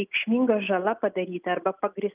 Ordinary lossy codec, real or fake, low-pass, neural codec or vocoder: Opus, 24 kbps; real; 3.6 kHz; none